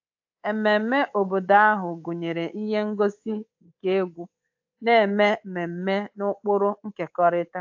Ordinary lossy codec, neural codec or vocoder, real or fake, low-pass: AAC, 48 kbps; codec, 24 kHz, 3.1 kbps, DualCodec; fake; 7.2 kHz